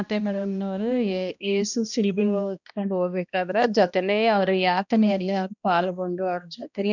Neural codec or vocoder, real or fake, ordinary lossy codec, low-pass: codec, 16 kHz, 1 kbps, X-Codec, HuBERT features, trained on balanced general audio; fake; none; 7.2 kHz